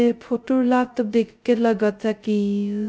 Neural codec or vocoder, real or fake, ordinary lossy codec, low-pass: codec, 16 kHz, 0.2 kbps, FocalCodec; fake; none; none